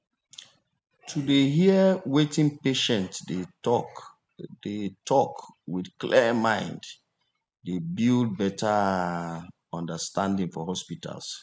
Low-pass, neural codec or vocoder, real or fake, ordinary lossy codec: none; none; real; none